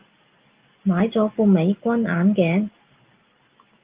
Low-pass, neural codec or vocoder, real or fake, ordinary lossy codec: 3.6 kHz; none; real; Opus, 32 kbps